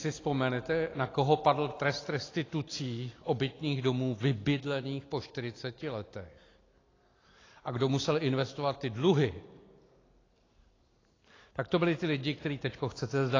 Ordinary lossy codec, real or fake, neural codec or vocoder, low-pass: AAC, 32 kbps; real; none; 7.2 kHz